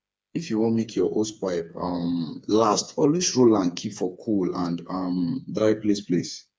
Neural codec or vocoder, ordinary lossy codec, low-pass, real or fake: codec, 16 kHz, 4 kbps, FreqCodec, smaller model; none; none; fake